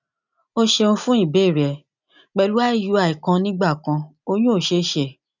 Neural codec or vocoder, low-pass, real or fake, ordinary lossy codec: none; 7.2 kHz; real; none